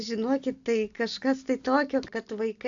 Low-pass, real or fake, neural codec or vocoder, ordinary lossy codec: 7.2 kHz; real; none; AAC, 64 kbps